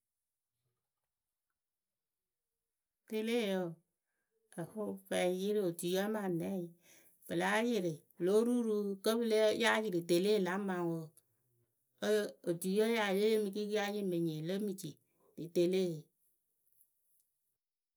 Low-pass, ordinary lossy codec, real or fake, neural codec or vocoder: none; none; real; none